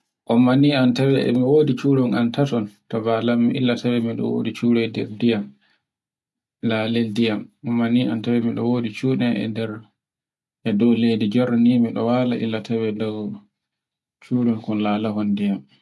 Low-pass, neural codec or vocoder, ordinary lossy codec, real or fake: none; none; none; real